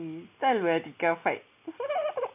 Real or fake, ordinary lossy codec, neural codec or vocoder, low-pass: real; none; none; 3.6 kHz